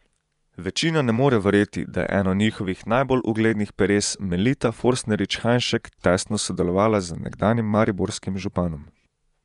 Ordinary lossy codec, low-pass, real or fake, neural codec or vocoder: none; 10.8 kHz; fake; vocoder, 24 kHz, 100 mel bands, Vocos